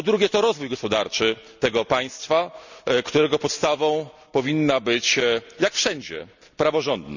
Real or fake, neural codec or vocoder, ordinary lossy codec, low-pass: real; none; none; 7.2 kHz